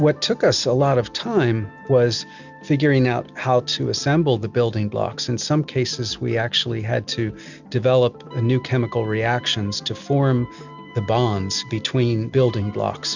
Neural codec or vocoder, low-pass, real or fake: none; 7.2 kHz; real